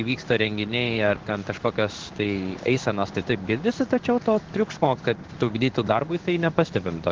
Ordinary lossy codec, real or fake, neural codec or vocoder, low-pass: Opus, 16 kbps; fake; codec, 16 kHz in and 24 kHz out, 1 kbps, XY-Tokenizer; 7.2 kHz